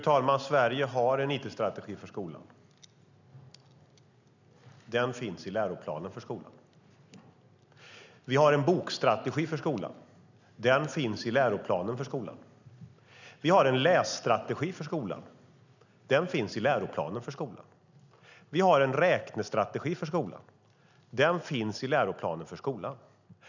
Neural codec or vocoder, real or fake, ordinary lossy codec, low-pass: none; real; none; 7.2 kHz